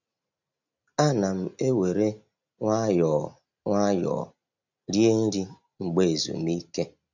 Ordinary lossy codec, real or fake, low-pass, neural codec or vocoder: none; real; 7.2 kHz; none